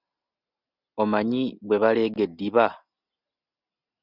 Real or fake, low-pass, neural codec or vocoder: real; 5.4 kHz; none